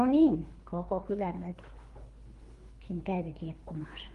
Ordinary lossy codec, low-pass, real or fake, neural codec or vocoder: Opus, 24 kbps; 10.8 kHz; fake; codec, 24 kHz, 3 kbps, HILCodec